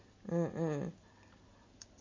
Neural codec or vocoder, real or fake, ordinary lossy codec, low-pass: none; real; MP3, 32 kbps; 7.2 kHz